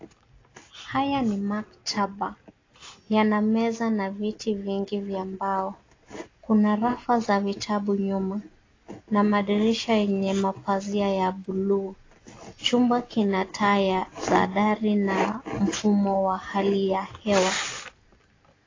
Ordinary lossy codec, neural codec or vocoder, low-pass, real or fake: AAC, 32 kbps; none; 7.2 kHz; real